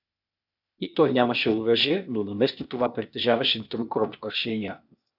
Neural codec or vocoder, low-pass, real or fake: codec, 16 kHz, 0.8 kbps, ZipCodec; 5.4 kHz; fake